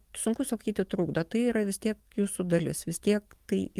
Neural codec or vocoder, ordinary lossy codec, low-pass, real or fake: codec, 44.1 kHz, 7.8 kbps, DAC; Opus, 32 kbps; 14.4 kHz; fake